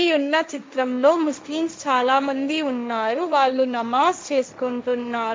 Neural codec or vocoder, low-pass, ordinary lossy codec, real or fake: codec, 16 kHz, 1.1 kbps, Voila-Tokenizer; none; none; fake